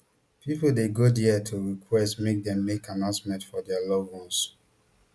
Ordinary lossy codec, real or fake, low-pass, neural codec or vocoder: none; real; none; none